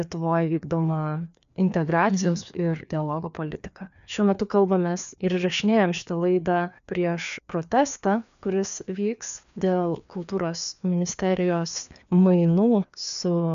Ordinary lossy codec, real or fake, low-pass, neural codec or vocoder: AAC, 96 kbps; fake; 7.2 kHz; codec, 16 kHz, 2 kbps, FreqCodec, larger model